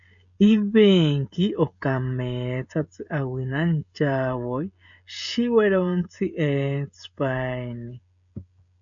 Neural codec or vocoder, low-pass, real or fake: codec, 16 kHz, 16 kbps, FreqCodec, smaller model; 7.2 kHz; fake